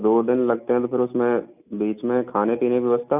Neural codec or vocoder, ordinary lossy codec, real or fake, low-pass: none; none; real; 3.6 kHz